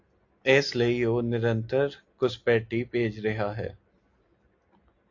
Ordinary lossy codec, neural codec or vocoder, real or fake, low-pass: AAC, 48 kbps; none; real; 7.2 kHz